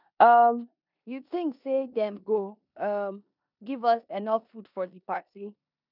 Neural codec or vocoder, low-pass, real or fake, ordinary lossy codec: codec, 16 kHz in and 24 kHz out, 0.9 kbps, LongCat-Audio-Codec, four codebook decoder; 5.4 kHz; fake; none